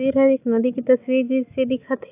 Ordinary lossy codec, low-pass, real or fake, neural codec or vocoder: AAC, 32 kbps; 3.6 kHz; real; none